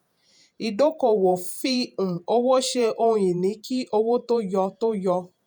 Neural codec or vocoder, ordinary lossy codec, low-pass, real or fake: vocoder, 48 kHz, 128 mel bands, Vocos; none; none; fake